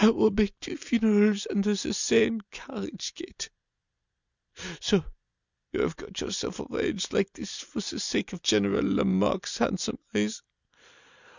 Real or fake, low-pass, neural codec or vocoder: real; 7.2 kHz; none